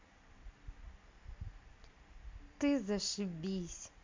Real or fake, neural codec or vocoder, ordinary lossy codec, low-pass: real; none; MP3, 64 kbps; 7.2 kHz